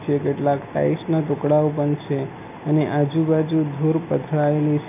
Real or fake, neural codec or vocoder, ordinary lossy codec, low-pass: real; none; none; 3.6 kHz